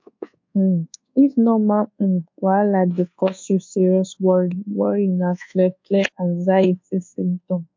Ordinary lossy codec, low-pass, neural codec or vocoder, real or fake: MP3, 48 kbps; 7.2 kHz; codec, 24 kHz, 1.2 kbps, DualCodec; fake